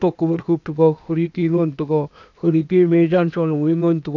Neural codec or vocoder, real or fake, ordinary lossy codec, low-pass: codec, 16 kHz, 0.8 kbps, ZipCodec; fake; none; 7.2 kHz